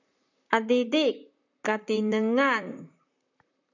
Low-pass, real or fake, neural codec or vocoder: 7.2 kHz; fake; vocoder, 44.1 kHz, 128 mel bands, Pupu-Vocoder